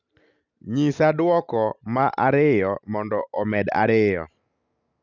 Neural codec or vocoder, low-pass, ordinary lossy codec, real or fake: none; 7.2 kHz; none; real